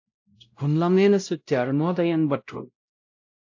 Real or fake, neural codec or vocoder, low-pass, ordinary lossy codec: fake; codec, 16 kHz, 0.5 kbps, X-Codec, WavLM features, trained on Multilingual LibriSpeech; 7.2 kHz; AAC, 48 kbps